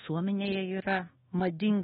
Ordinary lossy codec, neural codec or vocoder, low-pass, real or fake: AAC, 16 kbps; codec, 24 kHz, 1.2 kbps, DualCodec; 10.8 kHz; fake